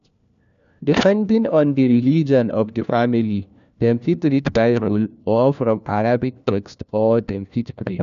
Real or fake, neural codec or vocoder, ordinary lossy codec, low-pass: fake; codec, 16 kHz, 1 kbps, FunCodec, trained on LibriTTS, 50 frames a second; none; 7.2 kHz